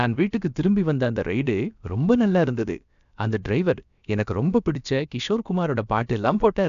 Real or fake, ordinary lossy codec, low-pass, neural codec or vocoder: fake; none; 7.2 kHz; codec, 16 kHz, about 1 kbps, DyCAST, with the encoder's durations